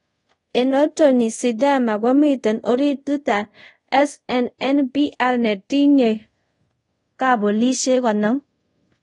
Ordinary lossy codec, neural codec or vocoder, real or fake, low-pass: AAC, 32 kbps; codec, 24 kHz, 0.5 kbps, DualCodec; fake; 10.8 kHz